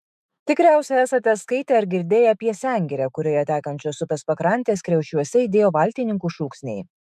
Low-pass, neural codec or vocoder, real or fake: 14.4 kHz; autoencoder, 48 kHz, 128 numbers a frame, DAC-VAE, trained on Japanese speech; fake